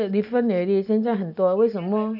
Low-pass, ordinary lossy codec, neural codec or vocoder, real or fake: 5.4 kHz; none; none; real